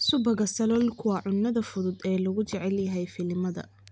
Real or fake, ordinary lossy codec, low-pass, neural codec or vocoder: real; none; none; none